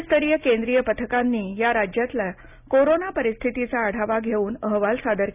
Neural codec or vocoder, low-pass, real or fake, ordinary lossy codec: none; 3.6 kHz; real; none